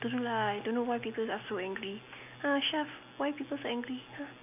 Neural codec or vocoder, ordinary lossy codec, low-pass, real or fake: none; none; 3.6 kHz; real